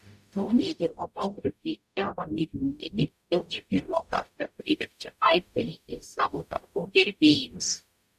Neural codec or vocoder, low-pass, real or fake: codec, 44.1 kHz, 0.9 kbps, DAC; 14.4 kHz; fake